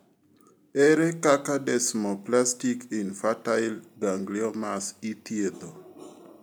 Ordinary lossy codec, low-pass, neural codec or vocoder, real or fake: none; none; none; real